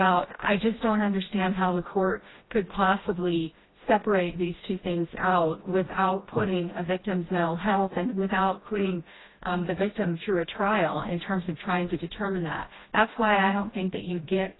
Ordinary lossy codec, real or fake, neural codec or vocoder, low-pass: AAC, 16 kbps; fake; codec, 16 kHz, 1 kbps, FreqCodec, smaller model; 7.2 kHz